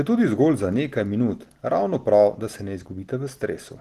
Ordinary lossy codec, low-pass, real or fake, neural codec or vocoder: Opus, 16 kbps; 14.4 kHz; real; none